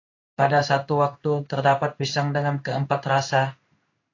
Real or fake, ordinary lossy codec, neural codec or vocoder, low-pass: fake; AAC, 48 kbps; codec, 16 kHz in and 24 kHz out, 1 kbps, XY-Tokenizer; 7.2 kHz